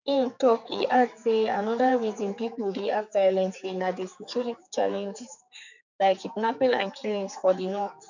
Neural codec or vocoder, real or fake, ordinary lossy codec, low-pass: codec, 16 kHz, 4 kbps, X-Codec, HuBERT features, trained on general audio; fake; none; 7.2 kHz